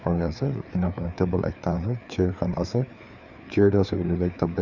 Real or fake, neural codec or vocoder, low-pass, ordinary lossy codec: fake; codec, 16 kHz, 4 kbps, FreqCodec, larger model; 7.2 kHz; none